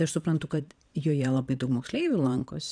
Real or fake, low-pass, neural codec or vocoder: fake; 9.9 kHz; vocoder, 22.05 kHz, 80 mel bands, Vocos